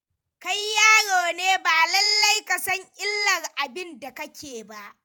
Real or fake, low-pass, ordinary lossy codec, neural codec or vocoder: fake; none; none; vocoder, 48 kHz, 128 mel bands, Vocos